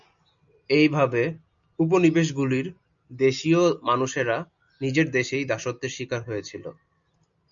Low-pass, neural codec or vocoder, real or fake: 7.2 kHz; none; real